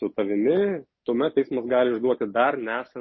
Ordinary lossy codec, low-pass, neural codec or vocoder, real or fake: MP3, 24 kbps; 7.2 kHz; none; real